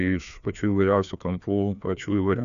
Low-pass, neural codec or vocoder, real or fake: 7.2 kHz; codec, 16 kHz, 2 kbps, FreqCodec, larger model; fake